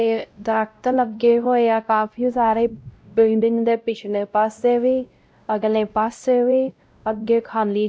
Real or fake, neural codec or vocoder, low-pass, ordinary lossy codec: fake; codec, 16 kHz, 0.5 kbps, X-Codec, WavLM features, trained on Multilingual LibriSpeech; none; none